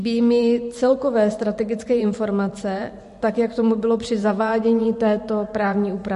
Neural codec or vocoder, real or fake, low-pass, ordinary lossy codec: vocoder, 44.1 kHz, 128 mel bands every 512 samples, BigVGAN v2; fake; 14.4 kHz; MP3, 48 kbps